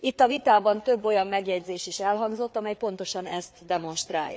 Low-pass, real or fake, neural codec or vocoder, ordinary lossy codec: none; fake; codec, 16 kHz, 4 kbps, FreqCodec, larger model; none